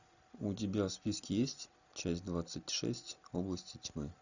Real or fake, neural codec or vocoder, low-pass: real; none; 7.2 kHz